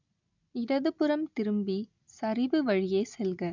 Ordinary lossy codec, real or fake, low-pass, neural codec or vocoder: none; real; 7.2 kHz; none